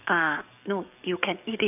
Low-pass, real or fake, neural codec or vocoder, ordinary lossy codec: 3.6 kHz; fake; codec, 44.1 kHz, 7.8 kbps, DAC; none